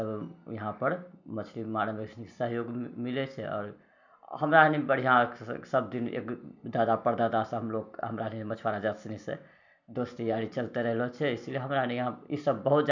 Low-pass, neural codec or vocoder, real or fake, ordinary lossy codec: 7.2 kHz; none; real; none